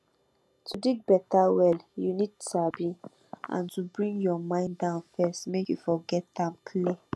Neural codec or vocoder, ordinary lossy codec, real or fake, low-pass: none; none; real; none